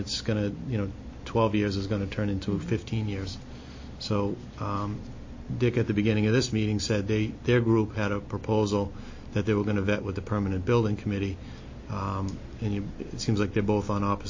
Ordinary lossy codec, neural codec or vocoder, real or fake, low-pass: MP3, 32 kbps; none; real; 7.2 kHz